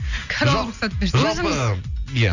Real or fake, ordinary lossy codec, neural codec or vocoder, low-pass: real; none; none; 7.2 kHz